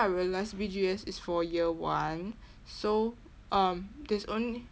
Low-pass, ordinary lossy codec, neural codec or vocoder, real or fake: none; none; none; real